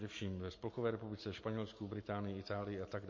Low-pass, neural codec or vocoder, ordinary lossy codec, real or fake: 7.2 kHz; none; MP3, 32 kbps; real